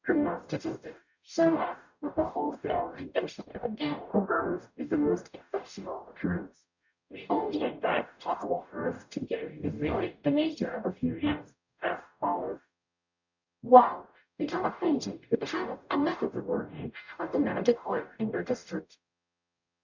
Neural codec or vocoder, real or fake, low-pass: codec, 44.1 kHz, 0.9 kbps, DAC; fake; 7.2 kHz